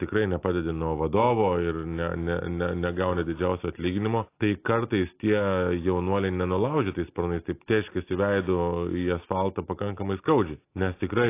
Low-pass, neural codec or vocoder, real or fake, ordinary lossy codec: 3.6 kHz; none; real; AAC, 24 kbps